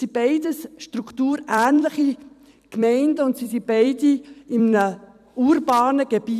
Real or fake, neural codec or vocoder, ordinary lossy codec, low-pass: fake; vocoder, 44.1 kHz, 128 mel bands every 256 samples, BigVGAN v2; none; 14.4 kHz